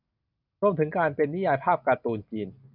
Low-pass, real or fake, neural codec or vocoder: 5.4 kHz; real; none